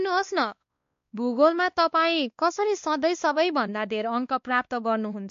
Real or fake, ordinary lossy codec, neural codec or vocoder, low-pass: fake; MP3, 48 kbps; codec, 16 kHz, 2 kbps, X-Codec, WavLM features, trained on Multilingual LibriSpeech; 7.2 kHz